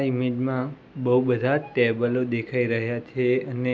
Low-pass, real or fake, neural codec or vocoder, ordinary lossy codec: none; real; none; none